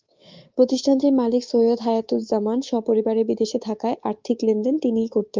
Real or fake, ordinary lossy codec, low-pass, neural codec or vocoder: fake; Opus, 24 kbps; 7.2 kHz; codec, 24 kHz, 3.1 kbps, DualCodec